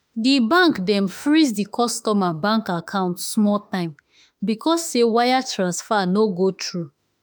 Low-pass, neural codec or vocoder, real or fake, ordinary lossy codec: none; autoencoder, 48 kHz, 32 numbers a frame, DAC-VAE, trained on Japanese speech; fake; none